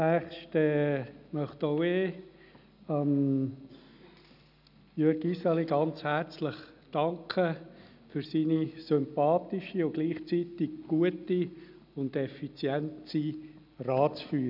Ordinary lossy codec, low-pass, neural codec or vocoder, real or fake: none; 5.4 kHz; none; real